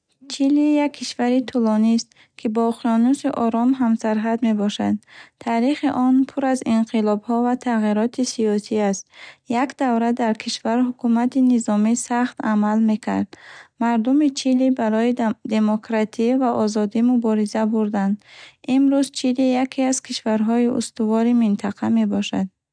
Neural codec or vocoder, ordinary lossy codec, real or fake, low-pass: none; none; real; 9.9 kHz